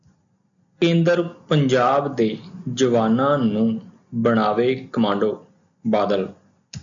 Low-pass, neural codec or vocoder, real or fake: 7.2 kHz; none; real